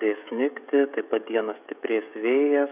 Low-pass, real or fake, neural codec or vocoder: 3.6 kHz; fake; codec, 16 kHz, 16 kbps, FreqCodec, smaller model